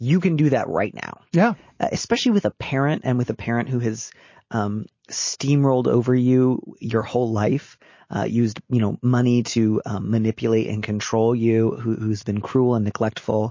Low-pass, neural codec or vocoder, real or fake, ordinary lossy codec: 7.2 kHz; none; real; MP3, 32 kbps